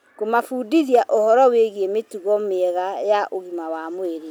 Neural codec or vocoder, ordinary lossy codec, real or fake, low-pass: none; none; real; none